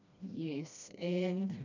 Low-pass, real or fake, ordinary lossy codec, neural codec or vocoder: 7.2 kHz; fake; none; codec, 16 kHz, 1 kbps, FreqCodec, smaller model